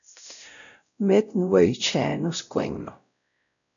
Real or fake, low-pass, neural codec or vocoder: fake; 7.2 kHz; codec, 16 kHz, 0.5 kbps, X-Codec, WavLM features, trained on Multilingual LibriSpeech